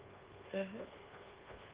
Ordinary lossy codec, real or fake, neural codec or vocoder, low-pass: Opus, 16 kbps; fake; codec, 24 kHz, 1.2 kbps, DualCodec; 3.6 kHz